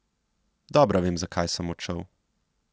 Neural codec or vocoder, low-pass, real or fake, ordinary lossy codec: none; none; real; none